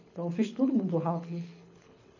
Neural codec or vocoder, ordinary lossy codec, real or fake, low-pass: codec, 24 kHz, 6 kbps, HILCodec; none; fake; 7.2 kHz